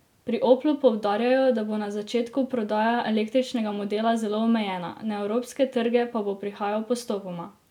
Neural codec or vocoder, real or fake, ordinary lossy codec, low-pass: none; real; none; 19.8 kHz